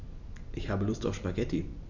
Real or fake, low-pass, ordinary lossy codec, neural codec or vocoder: real; 7.2 kHz; none; none